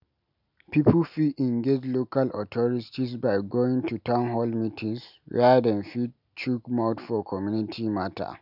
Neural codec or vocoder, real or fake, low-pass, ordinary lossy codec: none; real; 5.4 kHz; none